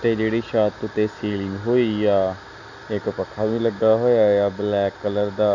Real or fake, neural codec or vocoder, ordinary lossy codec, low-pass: real; none; none; 7.2 kHz